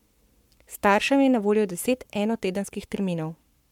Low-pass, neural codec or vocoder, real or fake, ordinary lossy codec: 19.8 kHz; codec, 44.1 kHz, 7.8 kbps, Pupu-Codec; fake; MP3, 96 kbps